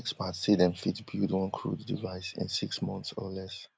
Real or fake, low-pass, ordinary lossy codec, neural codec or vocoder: real; none; none; none